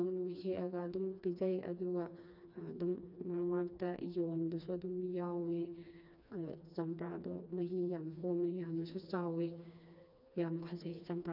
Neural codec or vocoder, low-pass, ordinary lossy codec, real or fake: codec, 16 kHz, 2 kbps, FreqCodec, smaller model; 5.4 kHz; none; fake